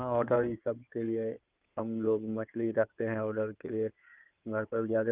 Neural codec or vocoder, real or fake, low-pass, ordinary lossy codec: codec, 16 kHz in and 24 kHz out, 2.2 kbps, FireRedTTS-2 codec; fake; 3.6 kHz; Opus, 24 kbps